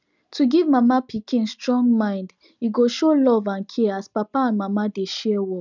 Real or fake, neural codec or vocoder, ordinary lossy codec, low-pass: real; none; none; 7.2 kHz